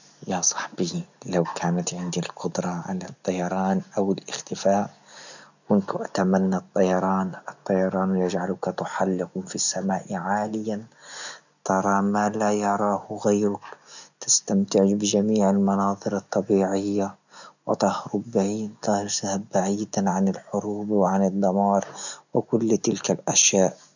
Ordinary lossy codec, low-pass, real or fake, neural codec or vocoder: none; 7.2 kHz; real; none